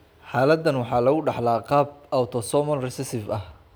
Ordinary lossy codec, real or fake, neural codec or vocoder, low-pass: none; real; none; none